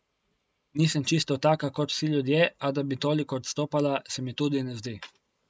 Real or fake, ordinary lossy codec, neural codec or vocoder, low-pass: real; none; none; none